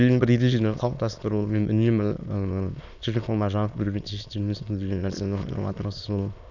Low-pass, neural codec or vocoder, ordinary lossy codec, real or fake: 7.2 kHz; autoencoder, 22.05 kHz, a latent of 192 numbers a frame, VITS, trained on many speakers; none; fake